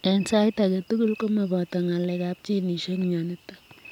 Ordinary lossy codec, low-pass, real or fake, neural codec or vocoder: none; 19.8 kHz; real; none